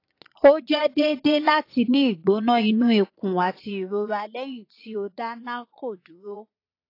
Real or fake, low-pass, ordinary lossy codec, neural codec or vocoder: fake; 5.4 kHz; AAC, 32 kbps; vocoder, 22.05 kHz, 80 mel bands, Vocos